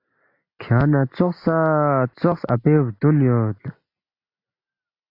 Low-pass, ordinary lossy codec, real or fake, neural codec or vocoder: 5.4 kHz; AAC, 32 kbps; real; none